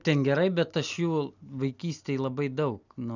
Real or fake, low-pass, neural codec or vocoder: real; 7.2 kHz; none